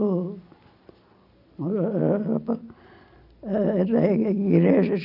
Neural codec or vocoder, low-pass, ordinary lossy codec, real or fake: none; 5.4 kHz; none; real